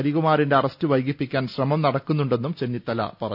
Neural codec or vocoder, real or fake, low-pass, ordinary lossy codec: none; real; 5.4 kHz; none